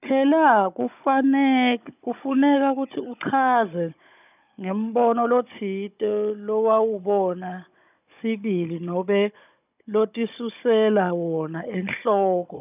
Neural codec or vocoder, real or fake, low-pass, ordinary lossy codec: codec, 16 kHz, 16 kbps, FunCodec, trained on Chinese and English, 50 frames a second; fake; 3.6 kHz; none